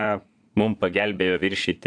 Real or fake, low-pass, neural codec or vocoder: fake; 9.9 kHz; vocoder, 22.05 kHz, 80 mel bands, Vocos